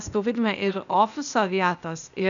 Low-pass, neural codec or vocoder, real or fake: 7.2 kHz; codec, 16 kHz, 0.8 kbps, ZipCodec; fake